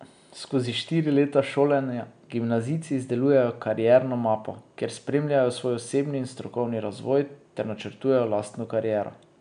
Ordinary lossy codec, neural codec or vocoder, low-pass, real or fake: none; none; 9.9 kHz; real